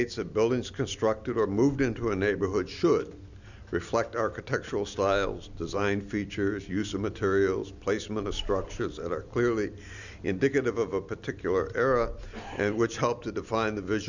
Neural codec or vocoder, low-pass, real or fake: none; 7.2 kHz; real